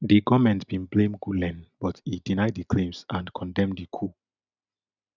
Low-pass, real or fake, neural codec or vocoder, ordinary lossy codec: 7.2 kHz; real; none; none